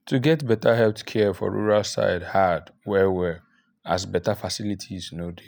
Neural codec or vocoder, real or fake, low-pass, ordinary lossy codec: none; real; none; none